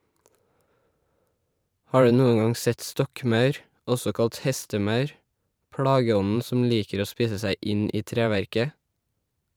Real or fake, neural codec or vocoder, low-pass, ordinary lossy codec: fake; vocoder, 44.1 kHz, 128 mel bands every 512 samples, BigVGAN v2; none; none